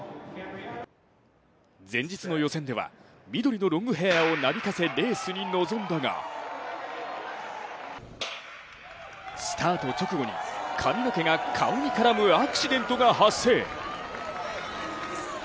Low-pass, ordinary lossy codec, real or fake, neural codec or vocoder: none; none; real; none